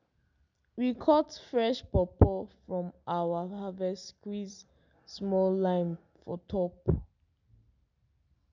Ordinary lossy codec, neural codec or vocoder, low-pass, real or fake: none; none; 7.2 kHz; real